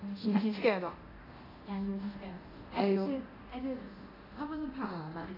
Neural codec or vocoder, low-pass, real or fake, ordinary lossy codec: codec, 24 kHz, 0.9 kbps, DualCodec; 5.4 kHz; fake; AAC, 24 kbps